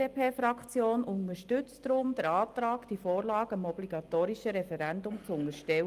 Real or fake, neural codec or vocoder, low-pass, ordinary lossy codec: real; none; 14.4 kHz; Opus, 24 kbps